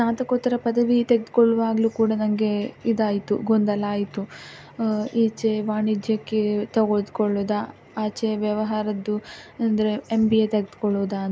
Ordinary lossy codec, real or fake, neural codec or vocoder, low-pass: none; real; none; none